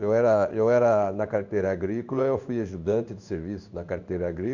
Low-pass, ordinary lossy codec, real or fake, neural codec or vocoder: 7.2 kHz; none; fake; codec, 16 kHz in and 24 kHz out, 1 kbps, XY-Tokenizer